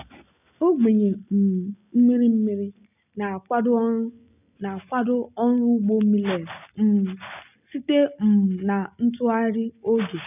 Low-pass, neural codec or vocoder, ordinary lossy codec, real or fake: 3.6 kHz; none; none; real